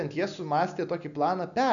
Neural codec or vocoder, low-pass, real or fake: none; 7.2 kHz; real